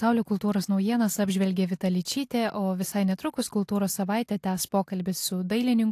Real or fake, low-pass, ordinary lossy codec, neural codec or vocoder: real; 14.4 kHz; AAC, 64 kbps; none